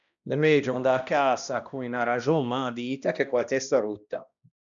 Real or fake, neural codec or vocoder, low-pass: fake; codec, 16 kHz, 1 kbps, X-Codec, HuBERT features, trained on balanced general audio; 7.2 kHz